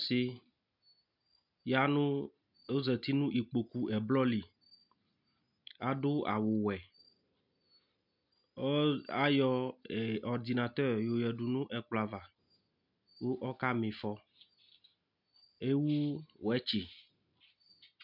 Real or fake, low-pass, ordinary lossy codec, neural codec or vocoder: real; 5.4 kHz; MP3, 48 kbps; none